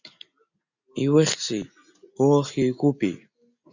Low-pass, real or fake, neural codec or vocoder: 7.2 kHz; real; none